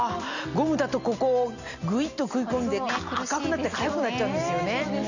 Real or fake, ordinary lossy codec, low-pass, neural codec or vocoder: real; none; 7.2 kHz; none